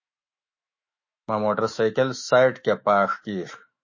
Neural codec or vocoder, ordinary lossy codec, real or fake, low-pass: autoencoder, 48 kHz, 128 numbers a frame, DAC-VAE, trained on Japanese speech; MP3, 32 kbps; fake; 7.2 kHz